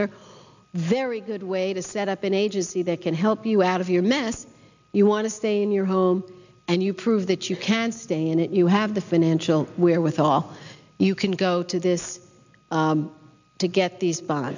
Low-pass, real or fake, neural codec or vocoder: 7.2 kHz; real; none